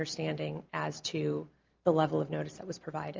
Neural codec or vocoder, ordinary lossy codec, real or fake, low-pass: none; Opus, 24 kbps; real; 7.2 kHz